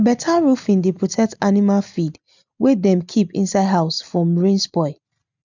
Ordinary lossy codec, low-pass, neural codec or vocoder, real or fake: none; 7.2 kHz; none; real